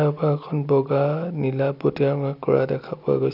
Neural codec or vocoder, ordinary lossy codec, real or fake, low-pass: none; none; real; 5.4 kHz